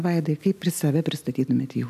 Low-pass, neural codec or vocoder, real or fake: 14.4 kHz; none; real